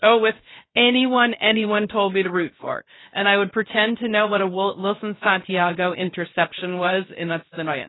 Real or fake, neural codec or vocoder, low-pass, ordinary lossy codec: fake; codec, 16 kHz, 0.3 kbps, FocalCodec; 7.2 kHz; AAC, 16 kbps